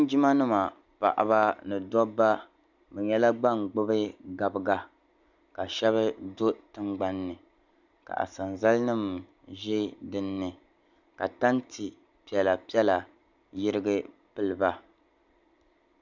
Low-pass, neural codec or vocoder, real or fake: 7.2 kHz; none; real